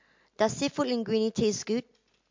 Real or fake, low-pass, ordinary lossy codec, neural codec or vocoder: real; 7.2 kHz; MP3, 48 kbps; none